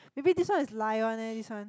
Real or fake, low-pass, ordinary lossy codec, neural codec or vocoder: real; none; none; none